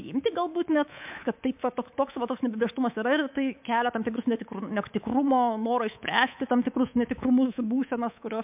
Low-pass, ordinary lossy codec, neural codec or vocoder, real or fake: 3.6 kHz; MP3, 32 kbps; codec, 24 kHz, 3.1 kbps, DualCodec; fake